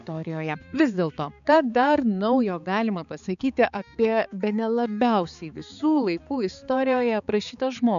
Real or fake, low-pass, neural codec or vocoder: fake; 7.2 kHz; codec, 16 kHz, 4 kbps, X-Codec, HuBERT features, trained on balanced general audio